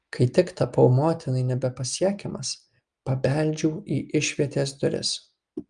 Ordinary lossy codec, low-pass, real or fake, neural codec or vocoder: Opus, 32 kbps; 9.9 kHz; real; none